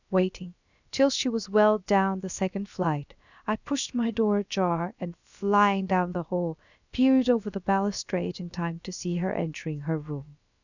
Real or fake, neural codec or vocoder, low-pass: fake; codec, 16 kHz, about 1 kbps, DyCAST, with the encoder's durations; 7.2 kHz